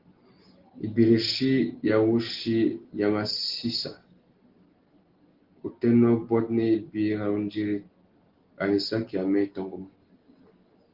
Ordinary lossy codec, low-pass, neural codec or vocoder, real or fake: Opus, 16 kbps; 5.4 kHz; none; real